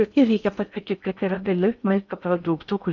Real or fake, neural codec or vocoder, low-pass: fake; codec, 16 kHz in and 24 kHz out, 0.6 kbps, FocalCodec, streaming, 4096 codes; 7.2 kHz